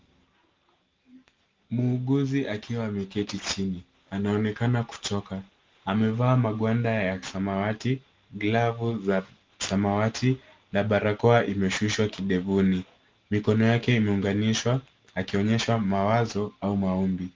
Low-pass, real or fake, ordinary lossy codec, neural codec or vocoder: 7.2 kHz; real; Opus, 32 kbps; none